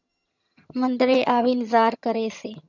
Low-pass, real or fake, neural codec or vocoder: 7.2 kHz; fake; vocoder, 22.05 kHz, 80 mel bands, HiFi-GAN